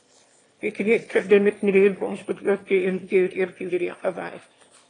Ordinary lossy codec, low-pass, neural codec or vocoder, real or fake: AAC, 32 kbps; 9.9 kHz; autoencoder, 22.05 kHz, a latent of 192 numbers a frame, VITS, trained on one speaker; fake